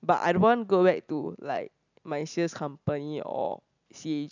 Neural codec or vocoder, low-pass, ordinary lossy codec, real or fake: none; 7.2 kHz; none; real